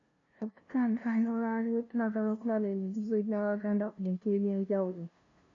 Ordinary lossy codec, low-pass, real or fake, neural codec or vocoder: MP3, 48 kbps; 7.2 kHz; fake; codec, 16 kHz, 0.5 kbps, FunCodec, trained on LibriTTS, 25 frames a second